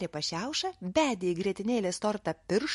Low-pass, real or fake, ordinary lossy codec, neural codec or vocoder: 14.4 kHz; real; MP3, 48 kbps; none